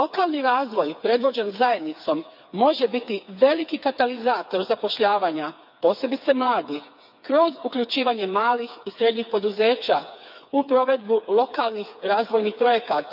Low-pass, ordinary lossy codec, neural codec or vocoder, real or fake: 5.4 kHz; none; codec, 16 kHz, 4 kbps, FreqCodec, smaller model; fake